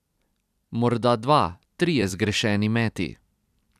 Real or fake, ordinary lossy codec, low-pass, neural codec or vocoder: fake; none; 14.4 kHz; vocoder, 44.1 kHz, 128 mel bands every 256 samples, BigVGAN v2